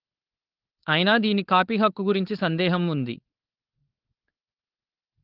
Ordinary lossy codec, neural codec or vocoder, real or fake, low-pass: Opus, 16 kbps; codec, 16 kHz, 4.8 kbps, FACodec; fake; 5.4 kHz